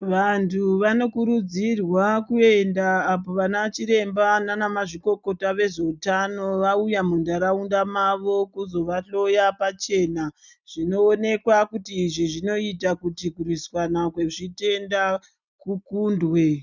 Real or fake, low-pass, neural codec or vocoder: real; 7.2 kHz; none